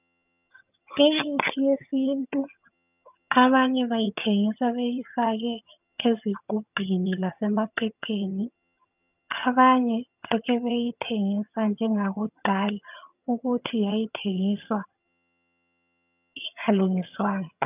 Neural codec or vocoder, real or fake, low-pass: vocoder, 22.05 kHz, 80 mel bands, HiFi-GAN; fake; 3.6 kHz